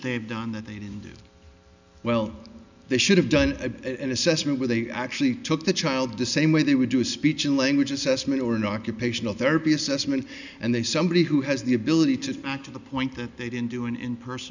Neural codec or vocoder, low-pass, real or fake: none; 7.2 kHz; real